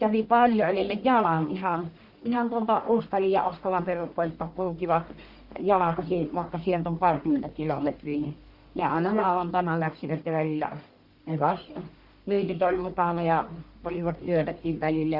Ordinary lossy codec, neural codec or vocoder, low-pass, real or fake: Opus, 64 kbps; codec, 44.1 kHz, 1.7 kbps, Pupu-Codec; 5.4 kHz; fake